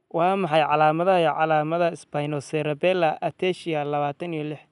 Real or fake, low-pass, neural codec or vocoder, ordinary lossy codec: real; 10.8 kHz; none; none